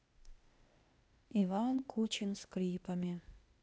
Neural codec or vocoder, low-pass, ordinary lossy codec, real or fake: codec, 16 kHz, 0.8 kbps, ZipCodec; none; none; fake